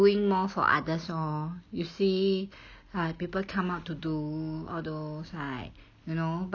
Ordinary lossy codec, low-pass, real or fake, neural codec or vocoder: none; 7.2 kHz; real; none